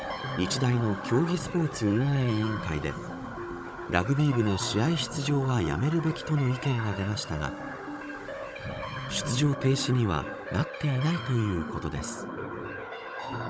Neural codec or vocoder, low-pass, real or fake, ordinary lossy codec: codec, 16 kHz, 16 kbps, FunCodec, trained on Chinese and English, 50 frames a second; none; fake; none